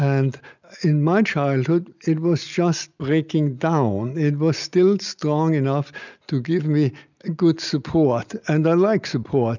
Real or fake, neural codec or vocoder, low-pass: real; none; 7.2 kHz